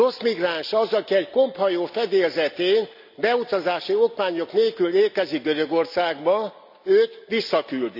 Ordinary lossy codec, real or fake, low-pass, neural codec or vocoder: none; real; 5.4 kHz; none